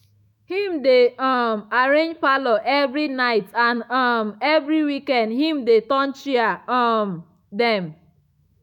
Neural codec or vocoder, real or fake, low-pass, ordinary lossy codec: autoencoder, 48 kHz, 128 numbers a frame, DAC-VAE, trained on Japanese speech; fake; 19.8 kHz; none